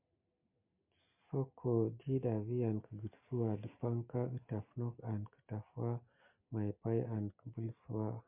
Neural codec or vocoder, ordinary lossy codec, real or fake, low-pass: none; MP3, 32 kbps; real; 3.6 kHz